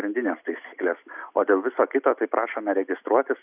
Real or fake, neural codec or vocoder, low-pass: real; none; 3.6 kHz